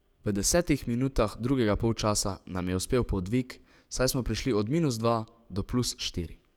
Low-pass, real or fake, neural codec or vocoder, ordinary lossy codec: 19.8 kHz; fake; codec, 44.1 kHz, 7.8 kbps, DAC; none